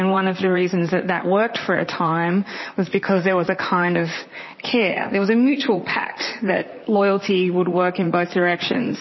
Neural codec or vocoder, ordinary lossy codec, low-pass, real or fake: vocoder, 44.1 kHz, 128 mel bands, Pupu-Vocoder; MP3, 24 kbps; 7.2 kHz; fake